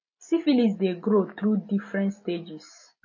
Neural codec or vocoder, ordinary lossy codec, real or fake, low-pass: none; MP3, 32 kbps; real; 7.2 kHz